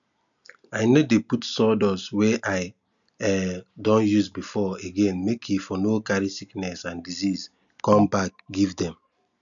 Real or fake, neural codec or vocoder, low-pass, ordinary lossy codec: real; none; 7.2 kHz; none